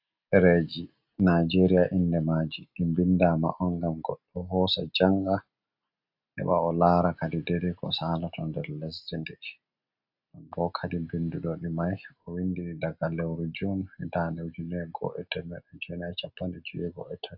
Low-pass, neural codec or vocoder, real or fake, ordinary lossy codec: 5.4 kHz; none; real; AAC, 48 kbps